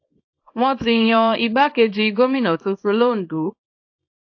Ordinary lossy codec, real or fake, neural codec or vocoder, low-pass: AAC, 48 kbps; fake; codec, 24 kHz, 0.9 kbps, WavTokenizer, small release; 7.2 kHz